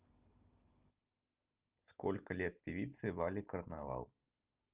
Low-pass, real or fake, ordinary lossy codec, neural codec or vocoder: 3.6 kHz; real; Opus, 32 kbps; none